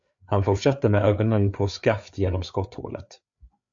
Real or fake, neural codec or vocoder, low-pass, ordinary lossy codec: fake; codec, 16 kHz, 4 kbps, FreqCodec, larger model; 7.2 kHz; AAC, 64 kbps